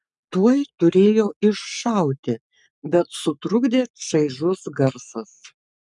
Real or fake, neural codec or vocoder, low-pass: fake; codec, 44.1 kHz, 7.8 kbps, Pupu-Codec; 10.8 kHz